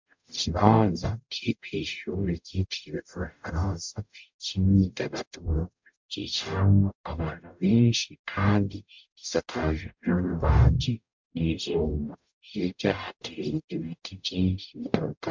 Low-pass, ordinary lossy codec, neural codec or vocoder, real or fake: 7.2 kHz; MP3, 64 kbps; codec, 44.1 kHz, 0.9 kbps, DAC; fake